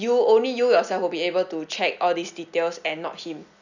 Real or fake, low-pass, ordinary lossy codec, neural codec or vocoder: real; 7.2 kHz; none; none